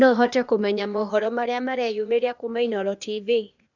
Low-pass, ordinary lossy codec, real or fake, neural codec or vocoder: 7.2 kHz; none; fake; codec, 16 kHz, 0.8 kbps, ZipCodec